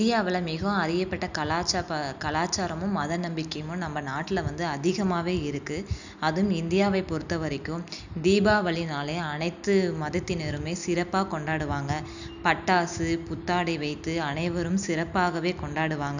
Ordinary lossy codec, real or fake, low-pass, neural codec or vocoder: none; real; 7.2 kHz; none